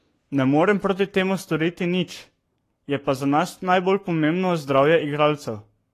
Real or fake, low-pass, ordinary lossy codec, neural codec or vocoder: fake; 14.4 kHz; AAC, 48 kbps; codec, 44.1 kHz, 7.8 kbps, Pupu-Codec